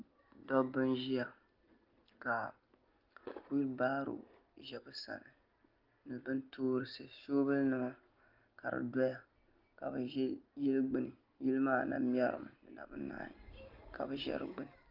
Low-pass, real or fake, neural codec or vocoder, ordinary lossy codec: 5.4 kHz; real; none; Opus, 32 kbps